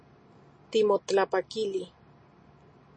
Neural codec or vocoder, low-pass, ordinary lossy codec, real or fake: none; 9.9 kHz; MP3, 32 kbps; real